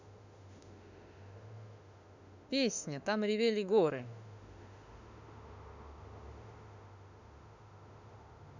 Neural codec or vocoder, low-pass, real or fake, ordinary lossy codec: autoencoder, 48 kHz, 32 numbers a frame, DAC-VAE, trained on Japanese speech; 7.2 kHz; fake; none